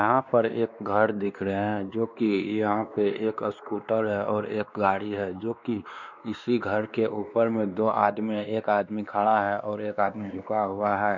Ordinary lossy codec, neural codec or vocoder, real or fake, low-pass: none; codec, 16 kHz, 2 kbps, X-Codec, WavLM features, trained on Multilingual LibriSpeech; fake; 7.2 kHz